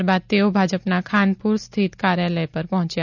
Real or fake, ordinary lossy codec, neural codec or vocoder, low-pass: real; none; none; 7.2 kHz